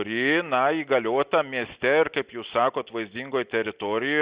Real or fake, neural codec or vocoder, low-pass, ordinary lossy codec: real; none; 3.6 kHz; Opus, 64 kbps